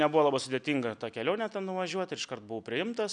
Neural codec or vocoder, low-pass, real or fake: none; 9.9 kHz; real